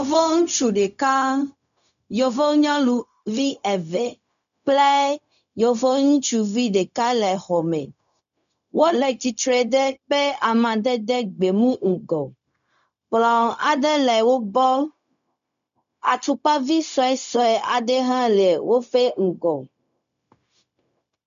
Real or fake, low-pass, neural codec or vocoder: fake; 7.2 kHz; codec, 16 kHz, 0.4 kbps, LongCat-Audio-Codec